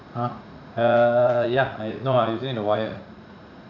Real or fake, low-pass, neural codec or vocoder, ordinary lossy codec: fake; 7.2 kHz; vocoder, 44.1 kHz, 80 mel bands, Vocos; none